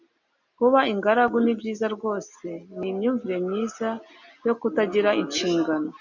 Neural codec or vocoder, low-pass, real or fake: none; 7.2 kHz; real